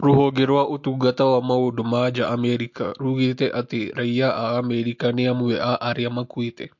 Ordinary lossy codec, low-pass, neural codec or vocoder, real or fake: MP3, 48 kbps; 7.2 kHz; none; real